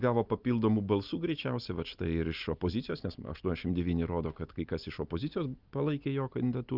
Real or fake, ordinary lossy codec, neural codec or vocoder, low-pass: real; Opus, 32 kbps; none; 5.4 kHz